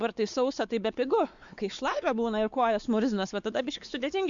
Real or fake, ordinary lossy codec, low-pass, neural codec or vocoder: fake; Opus, 64 kbps; 7.2 kHz; codec, 16 kHz, 4 kbps, X-Codec, WavLM features, trained on Multilingual LibriSpeech